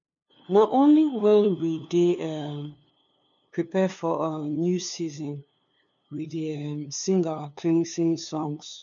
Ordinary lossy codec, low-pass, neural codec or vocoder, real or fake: none; 7.2 kHz; codec, 16 kHz, 2 kbps, FunCodec, trained on LibriTTS, 25 frames a second; fake